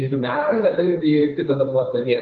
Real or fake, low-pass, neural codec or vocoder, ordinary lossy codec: fake; 7.2 kHz; codec, 16 kHz, 1.1 kbps, Voila-Tokenizer; Opus, 24 kbps